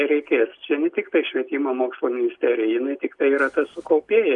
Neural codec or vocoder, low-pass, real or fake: none; 10.8 kHz; real